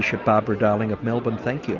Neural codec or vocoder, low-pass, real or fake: none; 7.2 kHz; real